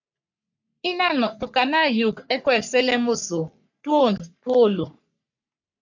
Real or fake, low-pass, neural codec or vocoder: fake; 7.2 kHz; codec, 44.1 kHz, 3.4 kbps, Pupu-Codec